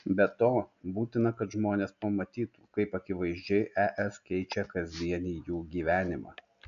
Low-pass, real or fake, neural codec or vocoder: 7.2 kHz; real; none